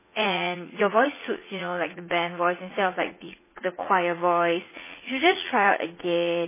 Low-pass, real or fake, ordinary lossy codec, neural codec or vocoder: 3.6 kHz; fake; MP3, 16 kbps; vocoder, 44.1 kHz, 80 mel bands, Vocos